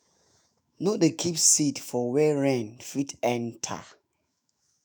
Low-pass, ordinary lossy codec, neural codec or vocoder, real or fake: none; none; autoencoder, 48 kHz, 128 numbers a frame, DAC-VAE, trained on Japanese speech; fake